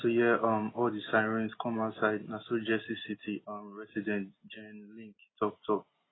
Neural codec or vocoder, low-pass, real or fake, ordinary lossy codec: none; 7.2 kHz; real; AAC, 16 kbps